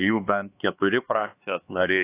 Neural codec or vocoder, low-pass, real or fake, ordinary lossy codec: codec, 16 kHz, 2 kbps, X-Codec, HuBERT features, trained on LibriSpeech; 3.6 kHz; fake; AAC, 24 kbps